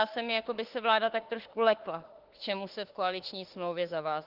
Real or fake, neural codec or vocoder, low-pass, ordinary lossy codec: fake; autoencoder, 48 kHz, 32 numbers a frame, DAC-VAE, trained on Japanese speech; 5.4 kHz; Opus, 16 kbps